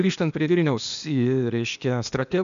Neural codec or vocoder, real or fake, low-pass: codec, 16 kHz, 0.8 kbps, ZipCodec; fake; 7.2 kHz